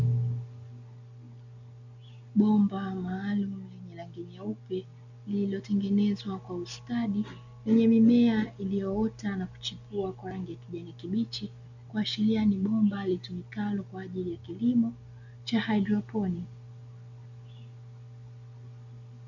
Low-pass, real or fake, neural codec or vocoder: 7.2 kHz; real; none